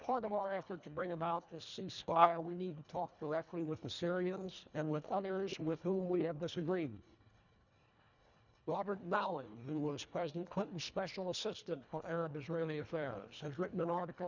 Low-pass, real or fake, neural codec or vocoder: 7.2 kHz; fake; codec, 24 kHz, 1.5 kbps, HILCodec